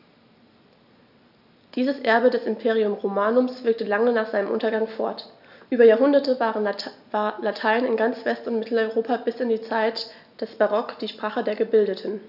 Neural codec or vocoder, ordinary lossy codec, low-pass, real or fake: none; none; 5.4 kHz; real